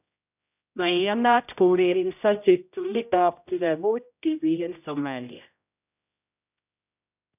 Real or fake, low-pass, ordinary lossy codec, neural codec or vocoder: fake; 3.6 kHz; none; codec, 16 kHz, 0.5 kbps, X-Codec, HuBERT features, trained on general audio